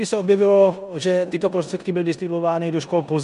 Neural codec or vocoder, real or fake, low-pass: codec, 16 kHz in and 24 kHz out, 0.9 kbps, LongCat-Audio-Codec, four codebook decoder; fake; 10.8 kHz